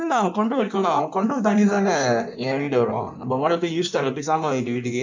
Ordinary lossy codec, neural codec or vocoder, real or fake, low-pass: MP3, 64 kbps; codec, 16 kHz in and 24 kHz out, 1.1 kbps, FireRedTTS-2 codec; fake; 7.2 kHz